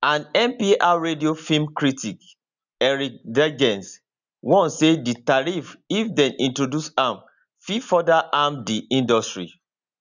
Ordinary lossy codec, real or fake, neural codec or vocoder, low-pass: none; real; none; 7.2 kHz